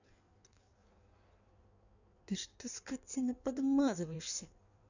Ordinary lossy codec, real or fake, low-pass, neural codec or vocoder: none; fake; 7.2 kHz; codec, 16 kHz in and 24 kHz out, 1.1 kbps, FireRedTTS-2 codec